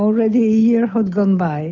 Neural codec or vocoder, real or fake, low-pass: none; real; 7.2 kHz